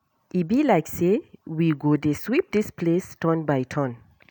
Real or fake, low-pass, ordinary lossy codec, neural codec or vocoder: real; none; none; none